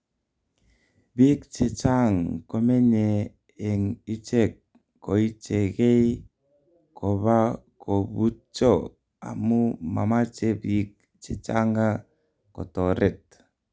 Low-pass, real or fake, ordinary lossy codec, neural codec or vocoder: none; real; none; none